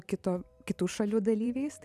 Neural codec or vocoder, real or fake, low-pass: vocoder, 44.1 kHz, 128 mel bands every 512 samples, BigVGAN v2; fake; 14.4 kHz